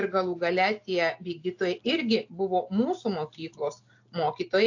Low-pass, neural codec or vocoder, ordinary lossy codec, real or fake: 7.2 kHz; none; AAC, 48 kbps; real